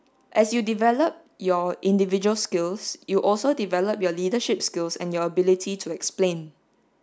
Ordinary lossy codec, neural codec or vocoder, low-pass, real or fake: none; none; none; real